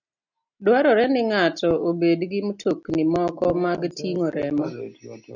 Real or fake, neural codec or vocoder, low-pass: real; none; 7.2 kHz